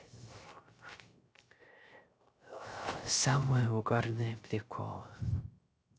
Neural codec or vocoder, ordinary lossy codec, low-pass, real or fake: codec, 16 kHz, 0.3 kbps, FocalCodec; none; none; fake